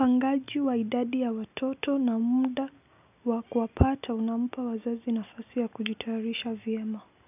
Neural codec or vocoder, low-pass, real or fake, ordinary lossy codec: none; 3.6 kHz; real; none